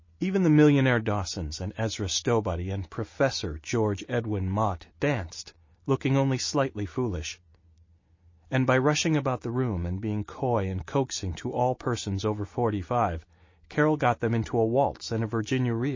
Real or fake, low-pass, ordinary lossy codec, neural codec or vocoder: real; 7.2 kHz; MP3, 32 kbps; none